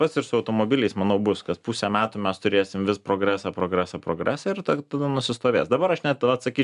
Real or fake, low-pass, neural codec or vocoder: real; 10.8 kHz; none